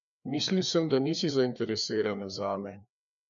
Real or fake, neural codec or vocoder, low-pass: fake; codec, 16 kHz, 2 kbps, FreqCodec, larger model; 7.2 kHz